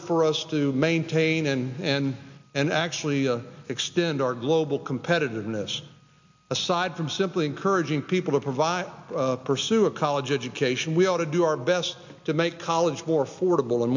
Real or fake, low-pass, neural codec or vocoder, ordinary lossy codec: real; 7.2 kHz; none; MP3, 64 kbps